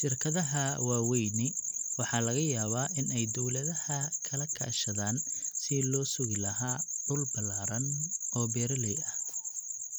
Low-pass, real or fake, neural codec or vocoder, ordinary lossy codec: none; real; none; none